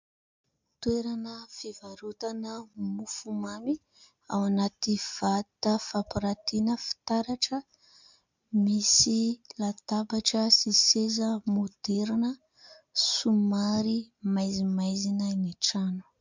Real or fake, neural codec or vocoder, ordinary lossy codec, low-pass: real; none; MP3, 64 kbps; 7.2 kHz